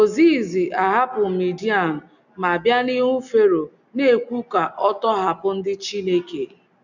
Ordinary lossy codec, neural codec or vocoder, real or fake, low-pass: none; none; real; 7.2 kHz